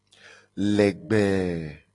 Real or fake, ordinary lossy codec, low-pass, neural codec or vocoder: real; AAC, 32 kbps; 10.8 kHz; none